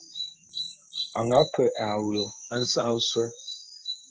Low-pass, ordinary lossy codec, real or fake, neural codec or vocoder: 7.2 kHz; Opus, 16 kbps; fake; codec, 16 kHz in and 24 kHz out, 1 kbps, XY-Tokenizer